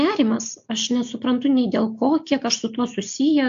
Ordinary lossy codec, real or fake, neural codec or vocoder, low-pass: AAC, 64 kbps; real; none; 7.2 kHz